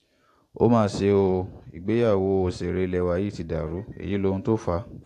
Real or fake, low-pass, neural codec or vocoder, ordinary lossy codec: fake; 14.4 kHz; vocoder, 44.1 kHz, 128 mel bands every 512 samples, BigVGAN v2; AAC, 64 kbps